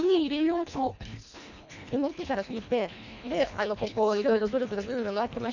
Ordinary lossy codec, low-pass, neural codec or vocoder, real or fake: none; 7.2 kHz; codec, 24 kHz, 1.5 kbps, HILCodec; fake